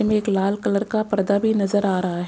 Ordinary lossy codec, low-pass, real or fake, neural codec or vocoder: none; none; real; none